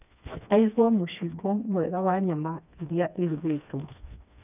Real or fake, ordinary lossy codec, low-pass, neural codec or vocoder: fake; none; 3.6 kHz; codec, 16 kHz, 2 kbps, FreqCodec, smaller model